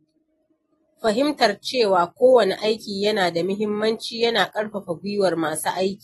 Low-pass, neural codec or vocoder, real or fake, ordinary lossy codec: 19.8 kHz; none; real; AAC, 32 kbps